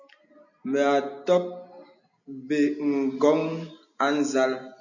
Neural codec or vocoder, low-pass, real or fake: none; 7.2 kHz; real